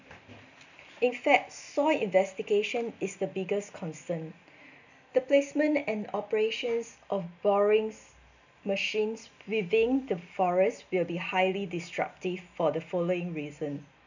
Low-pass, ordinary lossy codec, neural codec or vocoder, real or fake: 7.2 kHz; none; none; real